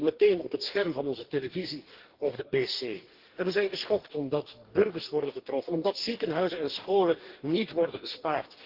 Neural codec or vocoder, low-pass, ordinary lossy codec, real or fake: codec, 44.1 kHz, 2.6 kbps, DAC; 5.4 kHz; Opus, 16 kbps; fake